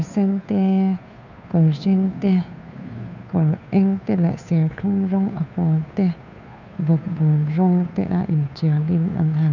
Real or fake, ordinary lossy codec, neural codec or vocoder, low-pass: fake; none; codec, 16 kHz, 2 kbps, FunCodec, trained on Chinese and English, 25 frames a second; 7.2 kHz